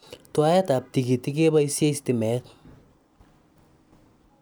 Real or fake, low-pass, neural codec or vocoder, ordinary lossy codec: real; none; none; none